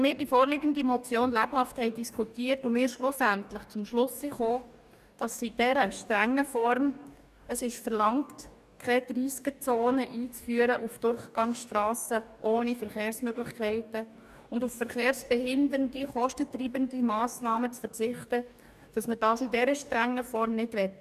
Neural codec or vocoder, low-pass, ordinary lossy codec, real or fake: codec, 44.1 kHz, 2.6 kbps, DAC; 14.4 kHz; none; fake